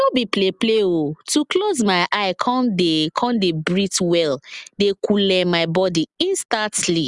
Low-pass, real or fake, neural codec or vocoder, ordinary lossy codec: 10.8 kHz; real; none; Opus, 64 kbps